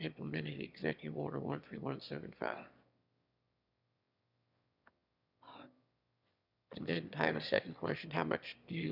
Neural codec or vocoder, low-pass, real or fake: autoencoder, 22.05 kHz, a latent of 192 numbers a frame, VITS, trained on one speaker; 5.4 kHz; fake